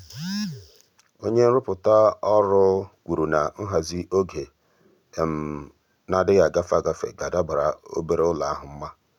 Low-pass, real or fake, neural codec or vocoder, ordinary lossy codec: 19.8 kHz; real; none; none